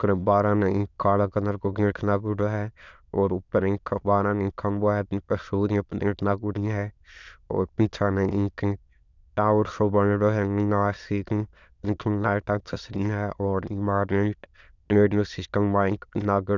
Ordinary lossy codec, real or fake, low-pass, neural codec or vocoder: none; fake; 7.2 kHz; autoencoder, 22.05 kHz, a latent of 192 numbers a frame, VITS, trained on many speakers